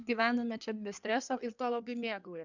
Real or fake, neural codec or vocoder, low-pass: fake; codec, 16 kHz in and 24 kHz out, 2.2 kbps, FireRedTTS-2 codec; 7.2 kHz